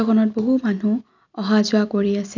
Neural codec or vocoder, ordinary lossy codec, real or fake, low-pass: none; none; real; 7.2 kHz